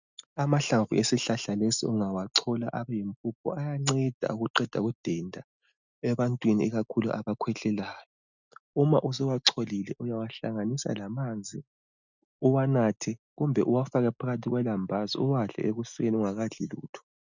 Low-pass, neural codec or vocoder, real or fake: 7.2 kHz; none; real